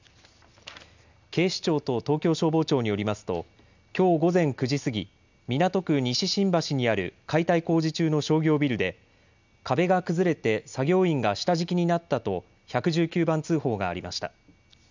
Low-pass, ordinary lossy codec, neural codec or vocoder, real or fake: 7.2 kHz; none; none; real